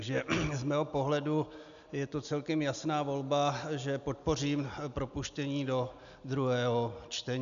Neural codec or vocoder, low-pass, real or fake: none; 7.2 kHz; real